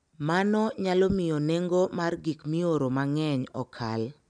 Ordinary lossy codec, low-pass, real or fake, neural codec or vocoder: none; 9.9 kHz; real; none